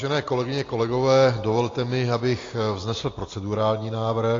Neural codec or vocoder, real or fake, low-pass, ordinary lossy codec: none; real; 7.2 kHz; AAC, 32 kbps